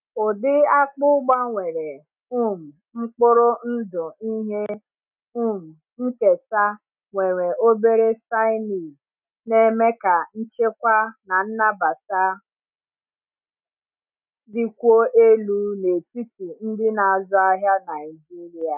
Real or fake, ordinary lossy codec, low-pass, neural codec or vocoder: real; none; 3.6 kHz; none